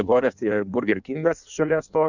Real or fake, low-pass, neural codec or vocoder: fake; 7.2 kHz; codec, 16 kHz in and 24 kHz out, 1.1 kbps, FireRedTTS-2 codec